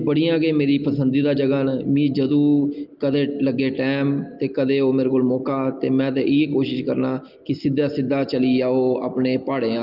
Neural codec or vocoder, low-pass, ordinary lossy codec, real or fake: none; 5.4 kHz; Opus, 32 kbps; real